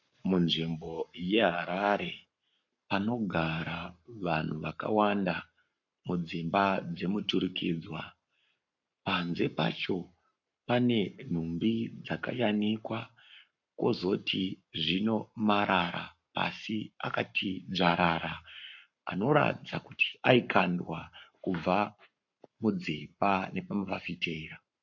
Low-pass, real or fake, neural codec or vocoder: 7.2 kHz; fake; codec, 44.1 kHz, 7.8 kbps, Pupu-Codec